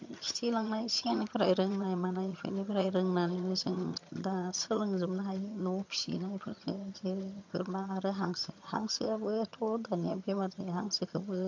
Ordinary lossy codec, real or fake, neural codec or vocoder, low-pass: MP3, 48 kbps; fake; vocoder, 22.05 kHz, 80 mel bands, HiFi-GAN; 7.2 kHz